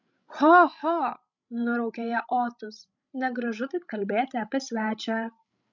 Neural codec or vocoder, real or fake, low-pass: codec, 16 kHz, 16 kbps, FreqCodec, larger model; fake; 7.2 kHz